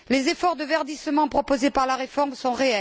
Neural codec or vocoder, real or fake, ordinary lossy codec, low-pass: none; real; none; none